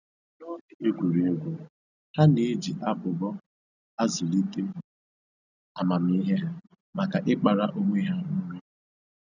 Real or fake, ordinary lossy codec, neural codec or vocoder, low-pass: real; none; none; 7.2 kHz